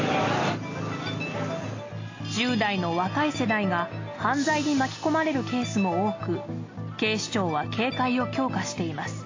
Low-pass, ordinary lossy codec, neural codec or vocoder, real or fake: 7.2 kHz; AAC, 32 kbps; none; real